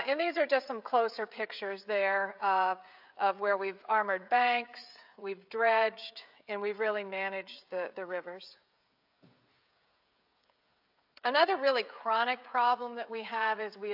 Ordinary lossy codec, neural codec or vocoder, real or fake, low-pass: AAC, 48 kbps; codec, 16 kHz, 16 kbps, FreqCodec, smaller model; fake; 5.4 kHz